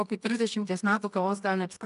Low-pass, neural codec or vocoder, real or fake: 10.8 kHz; codec, 24 kHz, 0.9 kbps, WavTokenizer, medium music audio release; fake